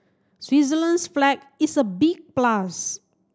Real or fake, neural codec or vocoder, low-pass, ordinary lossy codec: real; none; none; none